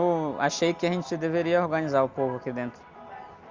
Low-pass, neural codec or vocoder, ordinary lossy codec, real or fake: 7.2 kHz; none; Opus, 32 kbps; real